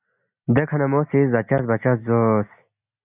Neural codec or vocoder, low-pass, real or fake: none; 3.6 kHz; real